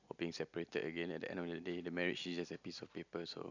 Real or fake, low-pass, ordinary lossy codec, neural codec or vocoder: real; 7.2 kHz; none; none